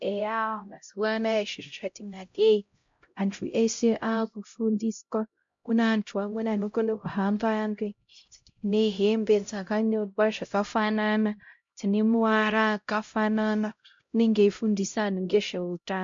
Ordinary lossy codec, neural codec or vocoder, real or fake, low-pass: MP3, 48 kbps; codec, 16 kHz, 0.5 kbps, X-Codec, HuBERT features, trained on LibriSpeech; fake; 7.2 kHz